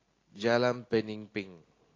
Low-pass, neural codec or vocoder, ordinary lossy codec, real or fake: 7.2 kHz; none; AAC, 32 kbps; real